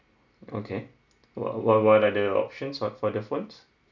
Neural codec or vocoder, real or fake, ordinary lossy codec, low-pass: none; real; none; 7.2 kHz